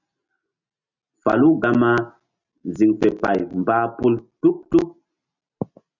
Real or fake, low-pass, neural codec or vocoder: real; 7.2 kHz; none